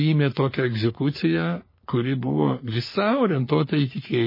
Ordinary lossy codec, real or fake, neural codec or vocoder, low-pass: MP3, 24 kbps; fake; codec, 44.1 kHz, 3.4 kbps, Pupu-Codec; 5.4 kHz